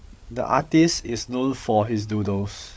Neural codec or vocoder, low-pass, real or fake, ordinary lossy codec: codec, 16 kHz, 8 kbps, FreqCodec, larger model; none; fake; none